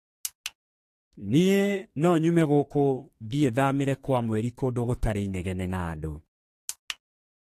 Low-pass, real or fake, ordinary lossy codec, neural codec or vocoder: 14.4 kHz; fake; AAC, 64 kbps; codec, 44.1 kHz, 2.6 kbps, SNAC